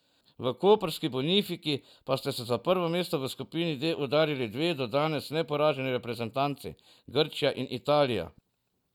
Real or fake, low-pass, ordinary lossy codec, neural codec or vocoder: real; 19.8 kHz; none; none